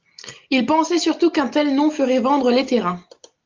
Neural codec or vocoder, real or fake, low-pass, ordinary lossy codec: none; real; 7.2 kHz; Opus, 32 kbps